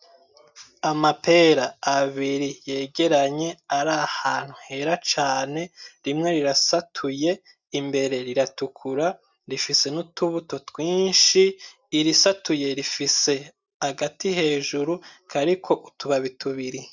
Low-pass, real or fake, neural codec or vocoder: 7.2 kHz; real; none